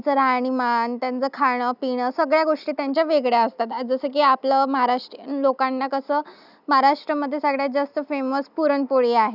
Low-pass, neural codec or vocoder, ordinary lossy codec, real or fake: 5.4 kHz; none; none; real